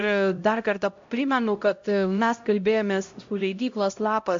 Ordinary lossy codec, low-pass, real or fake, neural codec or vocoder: MP3, 48 kbps; 7.2 kHz; fake; codec, 16 kHz, 0.5 kbps, X-Codec, HuBERT features, trained on LibriSpeech